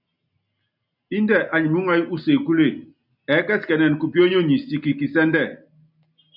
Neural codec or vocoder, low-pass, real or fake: none; 5.4 kHz; real